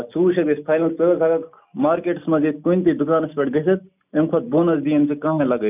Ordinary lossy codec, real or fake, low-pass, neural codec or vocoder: none; real; 3.6 kHz; none